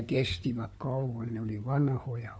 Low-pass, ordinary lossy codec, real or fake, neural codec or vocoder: none; none; fake; codec, 16 kHz, 4 kbps, FunCodec, trained on LibriTTS, 50 frames a second